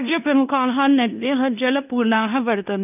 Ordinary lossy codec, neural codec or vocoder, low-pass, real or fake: none; codec, 16 kHz in and 24 kHz out, 0.9 kbps, LongCat-Audio-Codec, fine tuned four codebook decoder; 3.6 kHz; fake